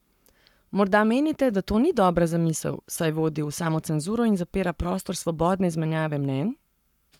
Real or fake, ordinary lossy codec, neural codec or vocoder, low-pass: fake; none; codec, 44.1 kHz, 7.8 kbps, Pupu-Codec; 19.8 kHz